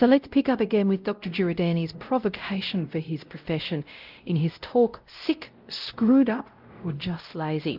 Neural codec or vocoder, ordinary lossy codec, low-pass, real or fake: codec, 16 kHz, 0.5 kbps, X-Codec, WavLM features, trained on Multilingual LibriSpeech; Opus, 32 kbps; 5.4 kHz; fake